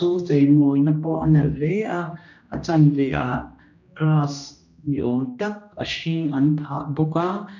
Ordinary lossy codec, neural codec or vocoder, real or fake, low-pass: none; codec, 16 kHz, 1 kbps, X-Codec, HuBERT features, trained on general audio; fake; 7.2 kHz